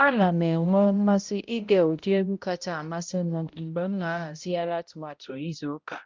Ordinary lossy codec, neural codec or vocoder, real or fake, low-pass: Opus, 32 kbps; codec, 16 kHz, 0.5 kbps, X-Codec, HuBERT features, trained on balanced general audio; fake; 7.2 kHz